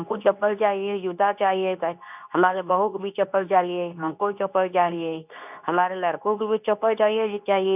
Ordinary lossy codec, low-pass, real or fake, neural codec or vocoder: none; 3.6 kHz; fake; codec, 24 kHz, 0.9 kbps, WavTokenizer, medium speech release version 2